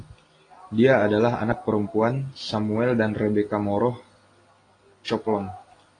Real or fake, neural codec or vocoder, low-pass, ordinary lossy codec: real; none; 9.9 kHz; AAC, 32 kbps